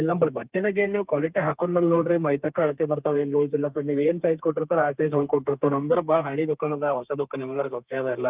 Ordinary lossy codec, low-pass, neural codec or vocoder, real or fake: Opus, 32 kbps; 3.6 kHz; codec, 32 kHz, 1.9 kbps, SNAC; fake